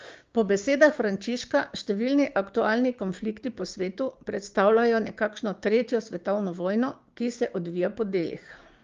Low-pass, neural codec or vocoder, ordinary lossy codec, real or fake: 7.2 kHz; codec, 16 kHz, 6 kbps, DAC; Opus, 32 kbps; fake